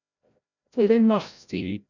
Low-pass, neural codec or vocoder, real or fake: 7.2 kHz; codec, 16 kHz, 0.5 kbps, FreqCodec, larger model; fake